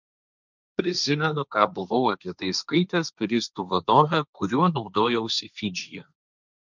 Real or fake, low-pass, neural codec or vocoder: fake; 7.2 kHz; codec, 16 kHz, 1.1 kbps, Voila-Tokenizer